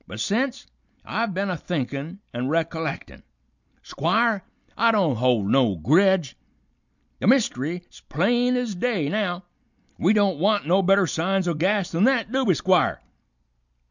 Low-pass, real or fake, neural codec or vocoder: 7.2 kHz; real; none